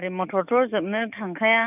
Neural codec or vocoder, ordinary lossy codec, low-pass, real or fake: codec, 44.1 kHz, 7.8 kbps, DAC; none; 3.6 kHz; fake